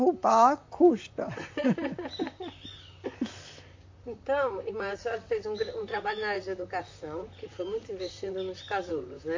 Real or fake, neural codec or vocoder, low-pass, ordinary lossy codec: fake; vocoder, 44.1 kHz, 128 mel bands, Pupu-Vocoder; 7.2 kHz; MP3, 48 kbps